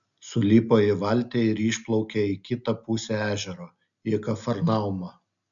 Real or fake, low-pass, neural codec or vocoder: real; 7.2 kHz; none